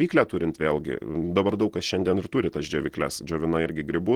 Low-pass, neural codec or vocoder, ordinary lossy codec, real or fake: 19.8 kHz; none; Opus, 16 kbps; real